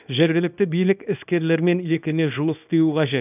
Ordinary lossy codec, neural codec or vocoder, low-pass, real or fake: none; codec, 24 kHz, 0.9 kbps, WavTokenizer, small release; 3.6 kHz; fake